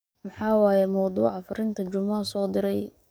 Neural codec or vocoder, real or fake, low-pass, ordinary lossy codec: codec, 44.1 kHz, 7.8 kbps, DAC; fake; none; none